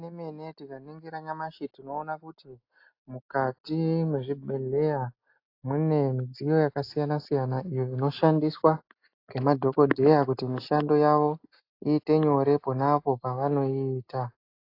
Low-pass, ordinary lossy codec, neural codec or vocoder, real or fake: 5.4 kHz; AAC, 32 kbps; none; real